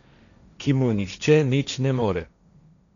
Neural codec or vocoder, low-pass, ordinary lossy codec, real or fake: codec, 16 kHz, 1.1 kbps, Voila-Tokenizer; 7.2 kHz; none; fake